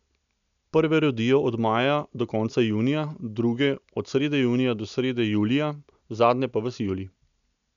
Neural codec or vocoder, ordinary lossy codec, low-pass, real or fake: none; none; 7.2 kHz; real